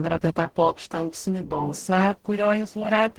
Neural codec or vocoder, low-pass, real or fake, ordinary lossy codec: codec, 44.1 kHz, 0.9 kbps, DAC; 19.8 kHz; fake; Opus, 16 kbps